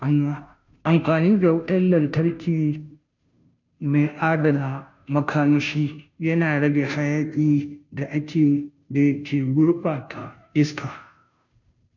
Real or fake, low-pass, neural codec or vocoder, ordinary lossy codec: fake; 7.2 kHz; codec, 16 kHz, 0.5 kbps, FunCodec, trained on Chinese and English, 25 frames a second; none